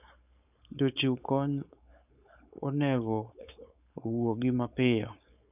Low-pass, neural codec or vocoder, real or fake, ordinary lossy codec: 3.6 kHz; codec, 16 kHz, 4.8 kbps, FACodec; fake; none